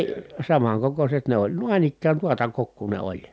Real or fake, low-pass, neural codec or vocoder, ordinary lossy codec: real; none; none; none